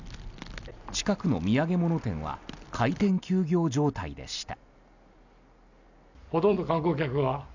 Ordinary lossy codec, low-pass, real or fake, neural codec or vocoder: none; 7.2 kHz; real; none